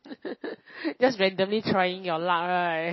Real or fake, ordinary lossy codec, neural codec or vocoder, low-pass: real; MP3, 24 kbps; none; 7.2 kHz